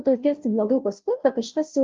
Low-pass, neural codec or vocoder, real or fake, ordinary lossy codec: 7.2 kHz; codec, 16 kHz, 0.5 kbps, FunCodec, trained on Chinese and English, 25 frames a second; fake; Opus, 32 kbps